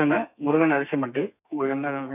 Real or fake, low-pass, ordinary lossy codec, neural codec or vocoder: fake; 3.6 kHz; none; codec, 32 kHz, 1.9 kbps, SNAC